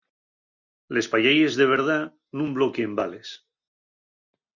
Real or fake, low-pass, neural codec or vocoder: real; 7.2 kHz; none